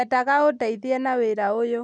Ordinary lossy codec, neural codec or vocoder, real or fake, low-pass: none; none; real; none